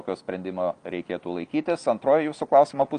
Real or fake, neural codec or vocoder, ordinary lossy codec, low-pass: real; none; Opus, 32 kbps; 9.9 kHz